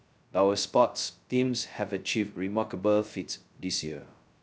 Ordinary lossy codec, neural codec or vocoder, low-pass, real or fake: none; codec, 16 kHz, 0.2 kbps, FocalCodec; none; fake